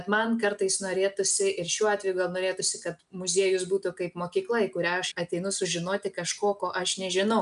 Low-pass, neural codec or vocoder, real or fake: 10.8 kHz; none; real